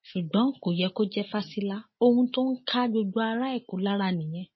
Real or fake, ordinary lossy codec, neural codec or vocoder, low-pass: real; MP3, 24 kbps; none; 7.2 kHz